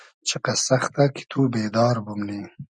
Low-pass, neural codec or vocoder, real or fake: 9.9 kHz; none; real